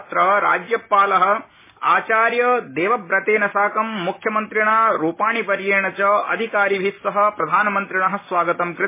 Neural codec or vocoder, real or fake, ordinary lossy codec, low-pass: none; real; MP3, 16 kbps; 3.6 kHz